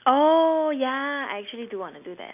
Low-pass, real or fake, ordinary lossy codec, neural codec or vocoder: 3.6 kHz; real; none; none